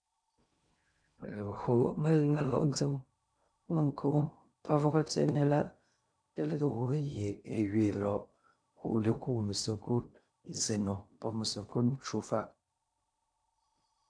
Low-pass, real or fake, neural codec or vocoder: 9.9 kHz; fake; codec, 16 kHz in and 24 kHz out, 0.6 kbps, FocalCodec, streaming, 2048 codes